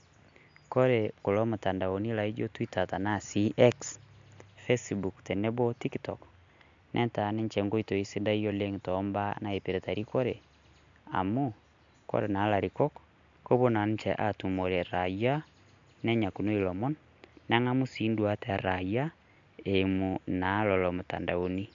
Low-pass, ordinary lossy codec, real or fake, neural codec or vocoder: 7.2 kHz; MP3, 64 kbps; real; none